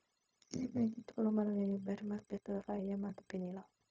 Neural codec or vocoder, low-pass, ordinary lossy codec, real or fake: codec, 16 kHz, 0.4 kbps, LongCat-Audio-Codec; none; none; fake